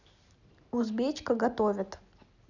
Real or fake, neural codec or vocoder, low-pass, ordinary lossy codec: real; none; 7.2 kHz; none